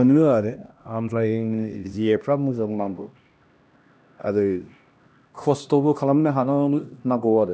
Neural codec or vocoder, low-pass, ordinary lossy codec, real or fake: codec, 16 kHz, 1 kbps, X-Codec, HuBERT features, trained on LibriSpeech; none; none; fake